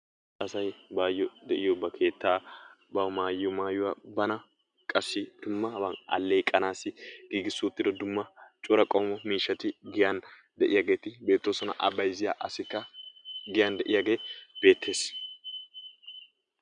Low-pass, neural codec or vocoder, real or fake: 9.9 kHz; none; real